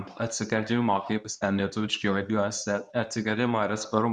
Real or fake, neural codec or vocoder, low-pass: fake; codec, 24 kHz, 0.9 kbps, WavTokenizer, medium speech release version 2; 10.8 kHz